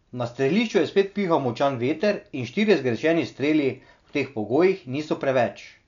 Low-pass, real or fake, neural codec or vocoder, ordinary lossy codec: 7.2 kHz; real; none; none